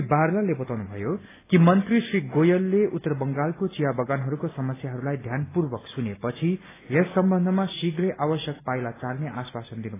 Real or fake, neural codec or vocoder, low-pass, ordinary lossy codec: real; none; 3.6 kHz; AAC, 16 kbps